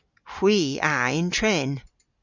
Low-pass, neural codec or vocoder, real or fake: 7.2 kHz; none; real